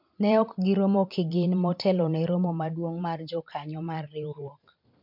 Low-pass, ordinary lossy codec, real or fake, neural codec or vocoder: 5.4 kHz; MP3, 48 kbps; fake; vocoder, 44.1 kHz, 128 mel bands every 512 samples, BigVGAN v2